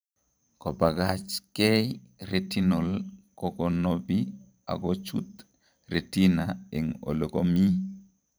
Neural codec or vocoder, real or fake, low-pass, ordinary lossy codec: vocoder, 44.1 kHz, 128 mel bands every 512 samples, BigVGAN v2; fake; none; none